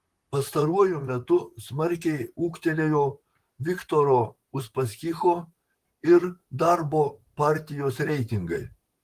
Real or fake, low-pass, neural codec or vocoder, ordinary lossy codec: fake; 14.4 kHz; vocoder, 44.1 kHz, 128 mel bands, Pupu-Vocoder; Opus, 24 kbps